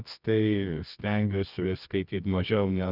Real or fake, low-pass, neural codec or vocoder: fake; 5.4 kHz; codec, 24 kHz, 0.9 kbps, WavTokenizer, medium music audio release